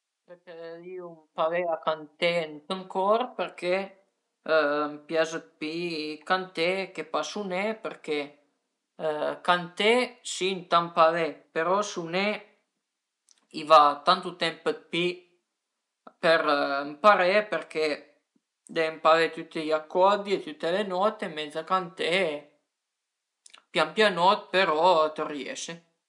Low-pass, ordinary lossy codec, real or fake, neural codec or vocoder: 10.8 kHz; none; real; none